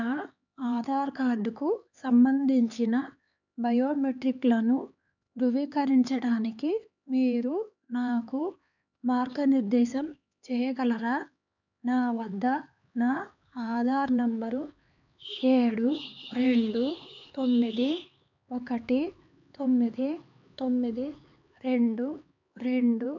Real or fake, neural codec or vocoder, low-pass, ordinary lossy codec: fake; codec, 16 kHz, 4 kbps, X-Codec, HuBERT features, trained on LibriSpeech; 7.2 kHz; none